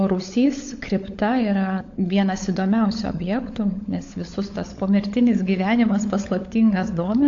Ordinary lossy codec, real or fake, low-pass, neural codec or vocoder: AAC, 48 kbps; fake; 7.2 kHz; codec, 16 kHz, 16 kbps, FunCodec, trained on LibriTTS, 50 frames a second